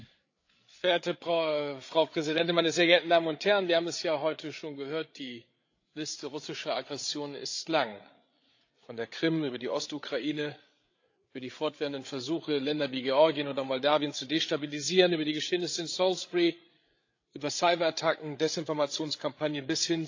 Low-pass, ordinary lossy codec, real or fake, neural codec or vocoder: 7.2 kHz; AAC, 48 kbps; fake; codec, 16 kHz, 8 kbps, FreqCodec, larger model